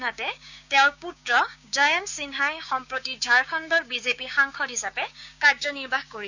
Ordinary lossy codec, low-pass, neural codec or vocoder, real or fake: none; 7.2 kHz; codec, 16 kHz, 6 kbps, DAC; fake